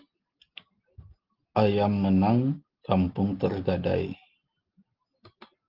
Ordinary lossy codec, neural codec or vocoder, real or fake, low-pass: Opus, 16 kbps; none; real; 5.4 kHz